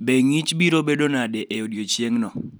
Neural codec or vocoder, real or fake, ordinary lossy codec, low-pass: none; real; none; none